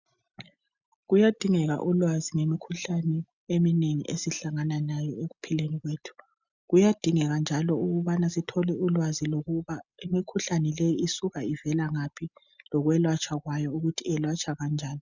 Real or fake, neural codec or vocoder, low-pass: real; none; 7.2 kHz